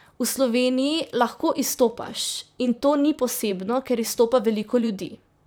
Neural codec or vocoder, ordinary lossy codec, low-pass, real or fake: vocoder, 44.1 kHz, 128 mel bands, Pupu-Vocoder; none; none; fake